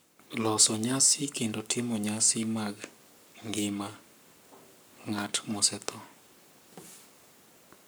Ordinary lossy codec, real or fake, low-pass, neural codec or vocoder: none; fake; none; codec, 44.1 kHz, 7.8 kbps, Pupu-Codec